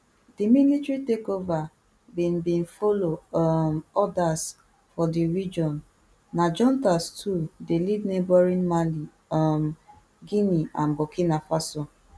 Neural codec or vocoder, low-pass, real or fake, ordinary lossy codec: none; none; real; none